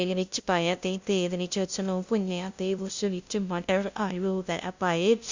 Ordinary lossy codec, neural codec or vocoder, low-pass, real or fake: Opus, 64 kbps; codec, 16 kHz, 0.5 kbps, FunCodec, trained on LibriTTS, 25 frames a second; 7.2 kHz; fake